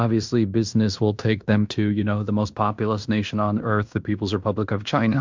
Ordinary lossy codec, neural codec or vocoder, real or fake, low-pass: MP3, 64 kbps; codec, 16 kHz in and 24 kHz out, 0.9 kbps, LongCat-Audio-Codec, fine tuned four codebook decoder; fake; 7.2 kHz